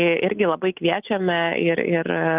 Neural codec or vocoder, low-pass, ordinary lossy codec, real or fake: none; 3.6 kHz; Opus, 32 kbps; real